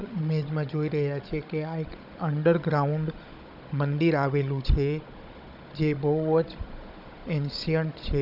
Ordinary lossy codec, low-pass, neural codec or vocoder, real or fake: none; 5.4 kHz; codec, 16 kHz, 16 kbps, FunCodec, trained on Chinese and English, 50 frames a second; fake